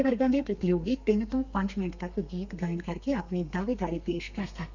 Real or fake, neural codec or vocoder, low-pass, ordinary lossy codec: fake; codec, 32 kHz, 1.9 kbps, SNAC; 7.2 kHz; none